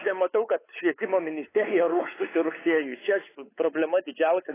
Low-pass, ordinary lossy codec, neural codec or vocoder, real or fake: 3.6 kHz; AAC, 16 kbps; codec, 16 kHz, 4 kbps, X-Codec, WavLM features, trained on Multilingual LibriSpeech; fake